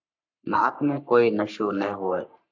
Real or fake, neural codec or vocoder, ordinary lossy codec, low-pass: fake; codec, 44.1 kHz, 3.4 kbps, Pupu-Codec; AAC, 48 kbps; 7.2 kHz